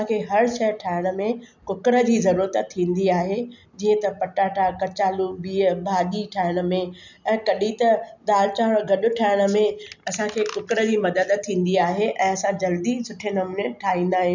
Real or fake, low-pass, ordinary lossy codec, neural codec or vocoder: real; 7.2 kHz; none; none